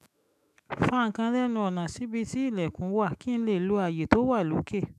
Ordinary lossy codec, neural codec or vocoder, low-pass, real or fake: none; autoencoder, 48 kHz, 128 numbers a frame, DAC-VAE, trained on Japanese speech; 14.4 kHz; fake